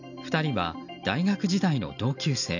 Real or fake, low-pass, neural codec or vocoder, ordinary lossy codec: real; 7.2 kHz; none; none